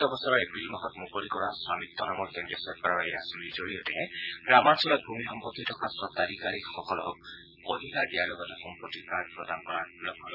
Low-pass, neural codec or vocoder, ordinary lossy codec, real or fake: 5.4 kHz; vocoder, 22.05 kHz, 80 mel bands, Vocos; none; fake